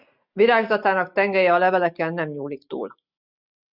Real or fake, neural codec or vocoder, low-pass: real; none; 5.4 kHz